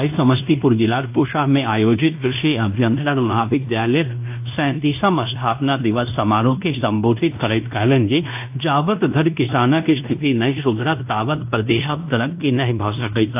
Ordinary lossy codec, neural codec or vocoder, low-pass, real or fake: AAC, 32 kbps; codec, 16 kHz in and 24 kHz out, 0.9 kbps, LongCat-Audio-Codec, fine tuned four codebook decoder; 3.6 kHz; fake